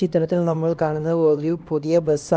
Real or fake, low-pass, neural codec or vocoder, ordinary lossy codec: fake; none; codec, 16 kHz, 1 kbps, X-Codec, HuBERT features, trained on LibriSpeech; none